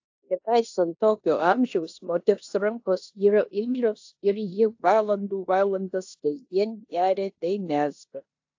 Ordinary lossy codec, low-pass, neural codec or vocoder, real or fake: AAC, 48 kbps; 7.2 kHz; codec, 16 kHz in and 24 kHz out, 0.9 kbps, LongCat-Audio-Codec, four codebook decoder; fake